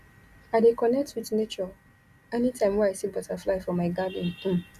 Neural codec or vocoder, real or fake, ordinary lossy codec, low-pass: none; real; Opus, 64 kbps; 14.4 kHz